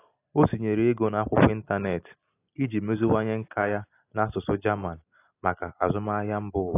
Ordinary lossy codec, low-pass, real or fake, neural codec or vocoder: AAC, 24 kbps; 3.6 kHz; real; none